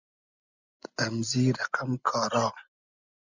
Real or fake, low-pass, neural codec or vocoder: real; 7.2 kHz; none